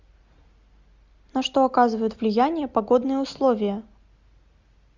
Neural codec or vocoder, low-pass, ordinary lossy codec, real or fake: none; 7.2 kHz; Opus, 64 kbps; real